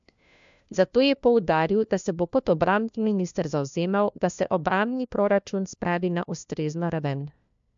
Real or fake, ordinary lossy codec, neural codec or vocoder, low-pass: fake; MP3, 64 kbps; codec, 16 kHz, 1 kbps, FunCodec, trained on LibriTTS, 50 frames a second; 7.2 kHz